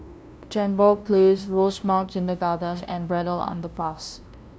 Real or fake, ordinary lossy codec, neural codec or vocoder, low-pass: fake; none; codec, 16 kHz, 0.5 kbps, FunCodec, trained on LibriTTS, 25 frames a second; none